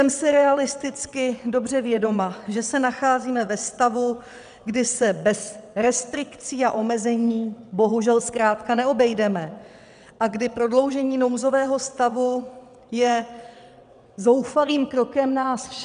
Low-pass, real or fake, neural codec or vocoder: 9.9 kHz; fake; vocoder, 22.05 kHz, 80 mel bands, WaveNeXt